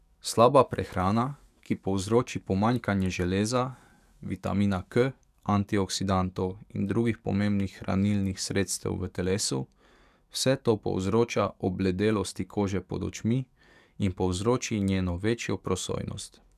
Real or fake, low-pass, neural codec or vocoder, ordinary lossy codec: fake; 14.4 kHz; codec, 44.1 kHz, 7.8 kbps, DAC; none